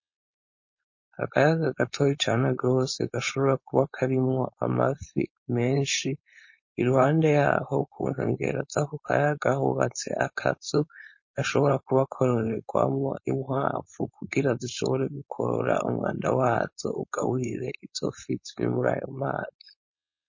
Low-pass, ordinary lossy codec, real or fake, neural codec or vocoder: 7.2 kHz; MP3, 32 kbps; fake; codec, 16 kHz, 4.8 kbps, FACodec